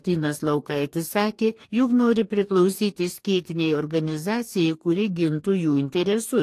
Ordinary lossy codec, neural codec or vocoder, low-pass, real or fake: AAC, 64 kbps; codec, 44.1 kHz, 2.6 kbps, DAC; 14.4 kHz; fake